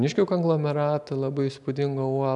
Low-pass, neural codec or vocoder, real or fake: 10.8 kHz; none; real